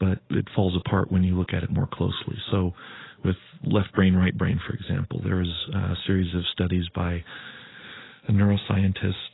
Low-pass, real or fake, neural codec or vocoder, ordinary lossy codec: 7.2 kHz; real; none; AAC, 16 kbps